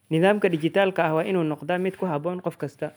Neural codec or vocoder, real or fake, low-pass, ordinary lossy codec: none; real; none; none